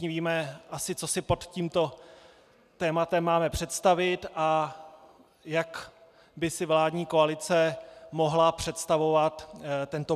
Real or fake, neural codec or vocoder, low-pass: real; none; 14.4 kHz